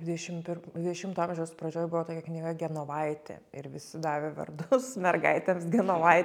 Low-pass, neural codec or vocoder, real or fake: 19.8 kHz; none; real